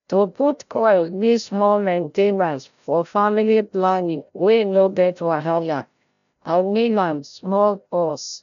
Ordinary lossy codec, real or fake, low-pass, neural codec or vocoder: none; fake; 7.2 kHz; codec, 16 kHz, 0.5 kbps, FreqCodec, larger model